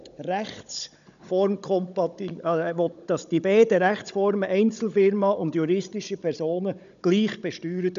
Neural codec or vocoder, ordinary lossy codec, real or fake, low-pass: codec, 16 kHz, 16 kbps, FunCodec, trained on Chinese and English, 50 frames a second; none; fake; 7.2 kHz